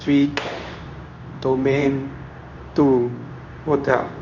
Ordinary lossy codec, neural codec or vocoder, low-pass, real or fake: none; codec, 24 kHz, 0.9 kbps, WavTokenizer, medium speech release version 2; 7.2 kHz; fake